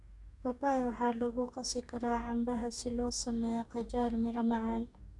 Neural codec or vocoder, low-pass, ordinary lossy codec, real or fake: codec, 44.1 kHz, 2.6 kbps, DAC; 10.8 kHz; none; fake